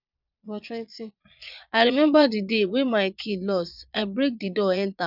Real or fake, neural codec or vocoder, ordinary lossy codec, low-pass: fake; vocoder, 22.05 kHz, 80 mel bands, Vocos; none; 5.4 kHz